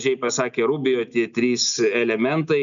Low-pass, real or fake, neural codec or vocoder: 7.2 kHz; real; none